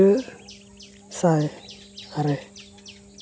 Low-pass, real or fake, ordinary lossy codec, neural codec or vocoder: none; real; none; none